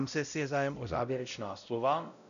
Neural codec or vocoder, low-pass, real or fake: codec, 16 kHz, 0.5 kbps, X-Codec, WavLM features, trained on Multilingual LibriSpeech; 7.2 kHz; fake